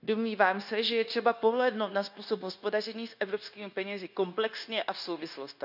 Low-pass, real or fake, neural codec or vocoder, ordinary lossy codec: 5.4 kHz; fake; codec, 16 kHz, 0.9 kbps, LongCat-Audio-Codec; none